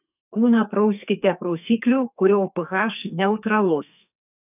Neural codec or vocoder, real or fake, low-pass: codec, 44.1 kHz, 2.6 kbps, SNAC; fake; 3.6 kHz